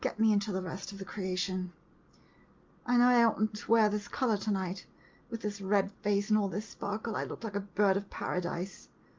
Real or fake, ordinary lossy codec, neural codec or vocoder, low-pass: real; Opus, 32 kbps; none; 7.2 kHz